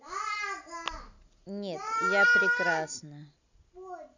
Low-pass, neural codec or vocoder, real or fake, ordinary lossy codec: 7.2 kHz; none; real; none